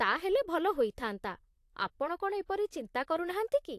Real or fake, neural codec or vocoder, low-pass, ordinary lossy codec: fake; vocoder, 44.1 kHz, 128 mel bands, Pupu-Vocoder; 14.4 kHz; AAC, 64 kbps